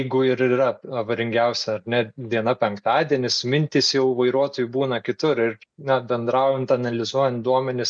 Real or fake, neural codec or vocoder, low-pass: real; none; 9.9 kHz